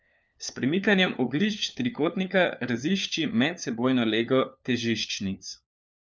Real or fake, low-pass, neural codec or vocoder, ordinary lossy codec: fake; none; codec, 16 kHz, 4 kbps, FunCodec, trained on LibriTTS, 50 frames a second; none